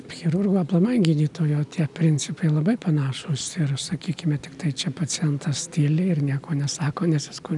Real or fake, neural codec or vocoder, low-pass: real; none; 10.8 kHz